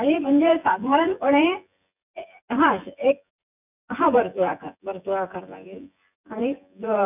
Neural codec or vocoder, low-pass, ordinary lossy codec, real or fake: vocoder, 24 kHz, 100 mel bands, Vocos; 3.6 kHz; none; fake